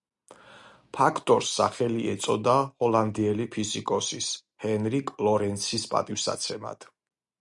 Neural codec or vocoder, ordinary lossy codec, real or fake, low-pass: none; Opus, 64 kbps; real; 10.8 kHz